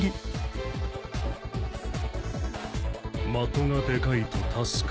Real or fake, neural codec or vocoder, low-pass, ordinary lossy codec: real; none; none; none